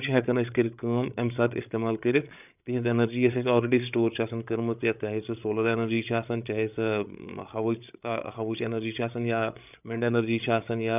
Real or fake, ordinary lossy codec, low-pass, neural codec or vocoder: fake; none; 3.6 kHz; codec, 16 kHz, 8 kbps, FreqCodec, larger model